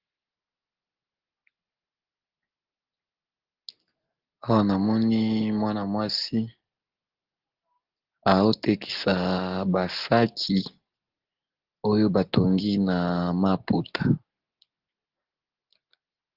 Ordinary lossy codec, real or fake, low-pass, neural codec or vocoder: Opus, 16 kbps; real; 5.4 kHz; none